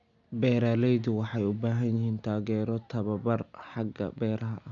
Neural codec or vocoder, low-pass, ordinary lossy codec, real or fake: none; 7.2 kHz; none; real